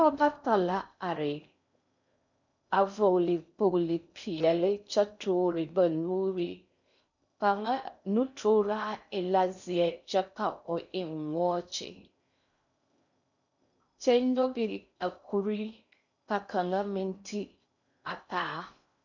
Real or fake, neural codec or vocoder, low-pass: fake; codec, 16 kHz in and 24 kHz out, 0.6 kbps, FocalCodec, streaming, 2048 codes; 7.2 kHz